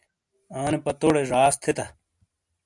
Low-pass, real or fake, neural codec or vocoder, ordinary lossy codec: 10.8 kHz; real; none; MP3, 96 kbps